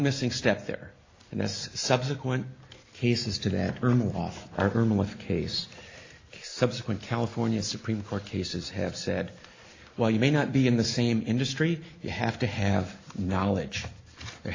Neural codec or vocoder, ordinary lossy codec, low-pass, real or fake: none; AAC, 32 kbps; 7.2 kHz; real